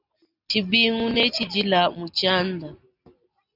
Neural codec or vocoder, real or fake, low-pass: none; real; 5.4 kHz